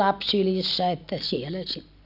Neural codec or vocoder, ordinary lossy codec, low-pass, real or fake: none; none; 5.4 kHz; real